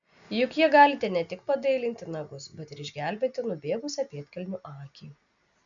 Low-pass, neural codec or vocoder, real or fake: 7.2 kHz; none; real